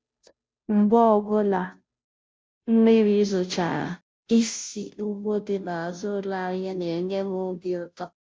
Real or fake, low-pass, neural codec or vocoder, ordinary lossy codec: fake; none; codec, 16 kHz, 0.5 kbps, FunCodec, trained on Chinese and English, 25 frames a second; none